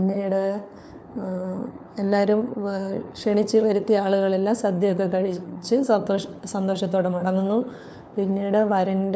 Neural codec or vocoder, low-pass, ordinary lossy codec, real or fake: codec, 16 kHz, 4 kbps, FunCodec, trained on LibriTTS, 50 frames a second; none; none; fake